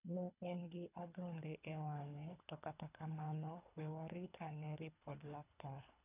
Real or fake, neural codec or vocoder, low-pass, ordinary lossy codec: fake; codec, 24 kHz, 3 kbps, HILCodec; 3.6 kHz; none